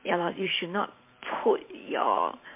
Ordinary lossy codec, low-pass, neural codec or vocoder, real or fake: MP3, 24 kbps; 3.6 kHz; vocoder, 44.1 kHz, 128 mel bands every 512 samples, BigVGAN v2; fake